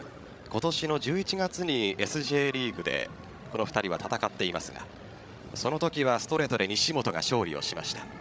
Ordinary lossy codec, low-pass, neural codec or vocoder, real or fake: none; none; codec, 16 kHz, 16 kbps, FreqCodec, larger model; fake